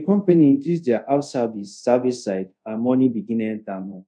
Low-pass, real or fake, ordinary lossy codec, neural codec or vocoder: 9.9 kHz; fake; none; codec, 24 kHz, 0.5 kbps, DualCodec